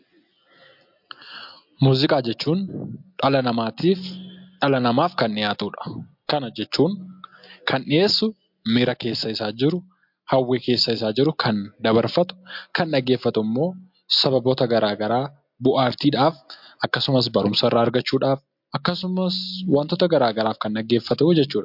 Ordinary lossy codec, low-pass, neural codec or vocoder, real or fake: MP3, 48 kbps; 5.4 kHz; none; real